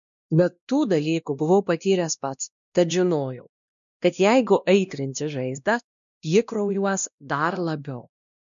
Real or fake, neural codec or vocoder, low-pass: fake; codec, 16 kHz, 1 kbps, X-Codec, WavLM features, trained on Multilingual LibriSpeech; 7.2 kHz